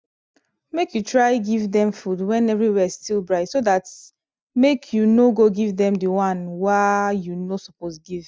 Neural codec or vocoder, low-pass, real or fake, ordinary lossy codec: none; none; real; none